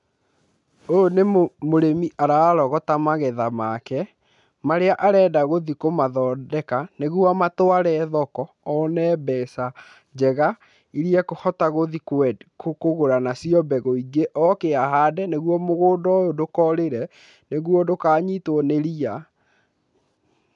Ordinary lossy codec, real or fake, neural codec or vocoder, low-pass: none; real; none; 10.8 kHz